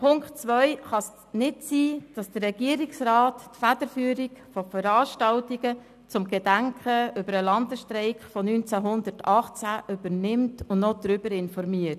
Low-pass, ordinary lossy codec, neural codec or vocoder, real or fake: 14.4 kHz; none; none; real